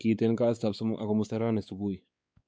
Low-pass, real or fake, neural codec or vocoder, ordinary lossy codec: none; fake; codec, 16 kHz, 4 kbps, X-Codec, WavLM features, trained on Multilingual LibriSpeech; none